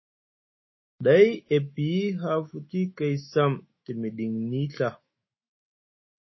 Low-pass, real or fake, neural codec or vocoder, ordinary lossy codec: 7.2 kHz; fake; autoencoder, 48 kHz, 128 numbers a frame, DAC-VAE, trained on Japanese speech; MP3, 24 kbps